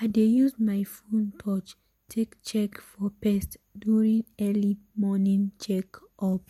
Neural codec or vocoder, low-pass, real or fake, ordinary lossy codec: none; 19.8 kHz; real; MP3, 64 kbps